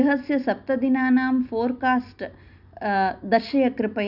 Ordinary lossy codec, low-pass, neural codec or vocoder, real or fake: none; 5.4 kHz; none; real